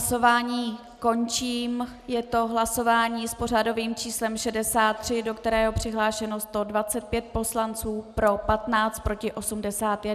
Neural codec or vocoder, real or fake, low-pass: none; real; 14.4 kHz